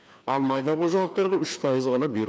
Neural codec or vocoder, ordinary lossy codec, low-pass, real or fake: codec, 16 kHz, 2 kbps, FreqCodec, larger model; none; none; fake